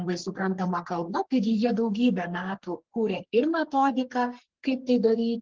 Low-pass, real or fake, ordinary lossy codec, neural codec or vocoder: 7.2 kHz; fake; Opus, 16 kbps; codec, 44.1 kHz, 3.4 kbps, Pupu-Codec